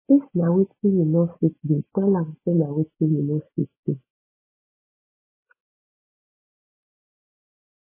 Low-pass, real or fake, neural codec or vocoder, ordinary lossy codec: 3.6 kHz; real; none; MP3, 16 kbps